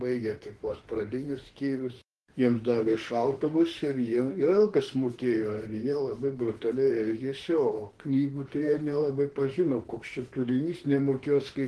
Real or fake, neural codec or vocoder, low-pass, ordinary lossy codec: fake; autoencoder, 48 kHz, 32 numbers a frame, DAC-VAE, trained on Japanese speech; 10.8 kHz; Opus, 16 kbps